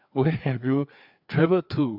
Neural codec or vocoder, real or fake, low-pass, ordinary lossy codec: codec, 16 kHz, 4 kbps, FreqCodec, larger model; fake; 5.4 kHz; none